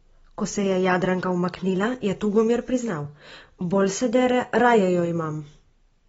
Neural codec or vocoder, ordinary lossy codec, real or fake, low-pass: none; AAC, 24 kbps; real; 10.8 kHz